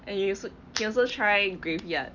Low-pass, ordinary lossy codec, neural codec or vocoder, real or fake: 7.2 kHz; none; none; real